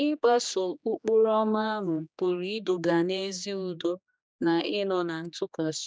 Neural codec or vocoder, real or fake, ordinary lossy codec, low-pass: codec, 16 kHz, 2 kbps, X-Codec, HuBERT features, trained on general audio; fake; none; none